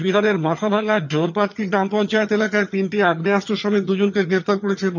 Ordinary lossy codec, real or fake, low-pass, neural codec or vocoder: none; fake; 7.2 kHz; vocoder, 22.05 kHz, 80 mel bands, HiFi-GAN